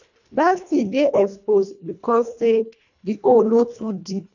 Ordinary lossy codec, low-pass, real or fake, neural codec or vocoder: none; 7.2 kHz; fake; codec, 24 kHz, 1.5 kbps, HILCodec